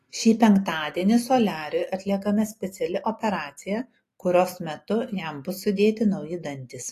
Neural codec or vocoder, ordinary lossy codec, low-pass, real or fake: none; AAC, 48 kbps; 14.4 kHz; real